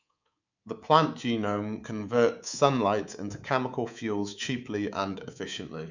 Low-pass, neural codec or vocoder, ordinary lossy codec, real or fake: 7.2 kHz; codec, 24 kHz, 3.1 kbps, DualCodec; none; fake